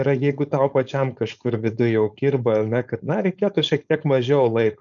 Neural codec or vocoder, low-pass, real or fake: codec, 16 kHz, 4.8 kbps, FACodec; 7.2 kHz; fake